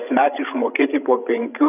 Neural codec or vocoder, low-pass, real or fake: codec, 16 kHz, 16 kbps, FreqCodec, larger model; 3.6 kHz; fake